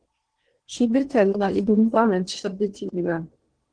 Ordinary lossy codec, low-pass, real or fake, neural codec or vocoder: Opus, 16 kbps; 9.9 kHz; fake; codec, 16 kHz in and 24 kHz out, 0.8 kbps, FocalCodec, streaming, 65536 codes